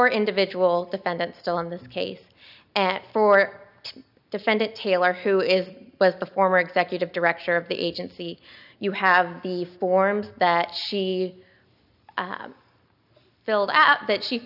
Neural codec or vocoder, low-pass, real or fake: none; 5.4 kHz; real